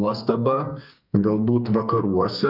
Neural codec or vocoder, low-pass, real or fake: codec, 44.1 kHz, 2.6 kbps, SNAC; 5.4 kHz; fake